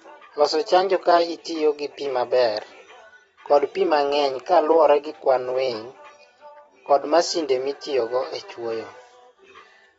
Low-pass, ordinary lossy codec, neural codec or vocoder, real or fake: 19.8 kHz; AAC, 24 kbps; vocoder, 44.1 kHz, 128 mel bands every 256 samples, BigVGAN v2; fake